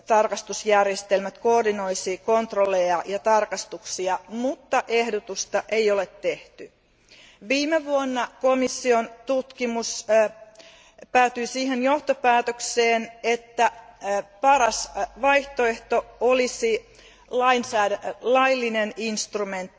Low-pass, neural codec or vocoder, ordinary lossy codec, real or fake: none; none; none; real